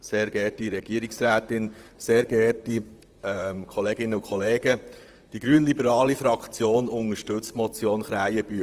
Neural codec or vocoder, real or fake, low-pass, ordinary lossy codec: vocoder, 44.1 kHz, 128 mel bands, Pupu-Vocoder; fake; 14.4 kHz; Opus, 24 kbps